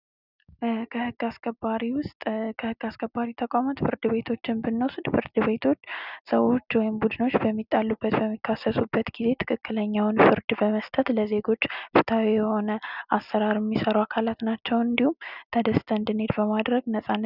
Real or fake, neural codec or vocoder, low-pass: real; none; 5.4 kHz